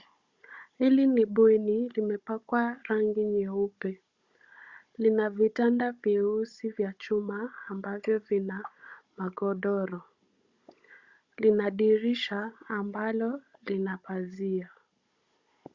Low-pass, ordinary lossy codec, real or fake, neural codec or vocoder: 7.2 kHz; Opus, 64 kbps; fake; codec, 16 kHz, 16 kbps, FunCodec, trained on Chinese and English, 50 frames a second